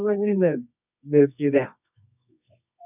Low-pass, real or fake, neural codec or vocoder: 3.6 kHz; fake; codec, 24 kHz, 0.9 kbps, WavTokenizer, medium music audio release